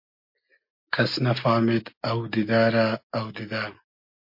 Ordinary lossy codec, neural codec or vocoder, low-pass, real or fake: MP3, 32 kbps; none; 5.4 kHz; real